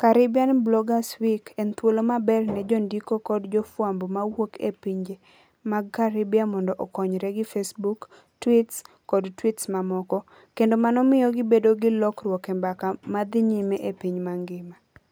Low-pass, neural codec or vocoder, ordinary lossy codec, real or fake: none; none; none; real